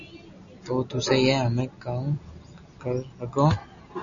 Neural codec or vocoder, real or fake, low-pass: none; real; 7.2 kHz